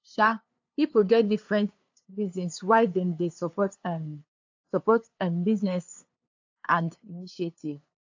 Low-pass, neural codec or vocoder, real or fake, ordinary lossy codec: 7.2 kHz; codec, 16 kHz, 2 kbps, FunCodec, trained on LibriTTS, 25 frames a second; fake; AAC, 48 kbps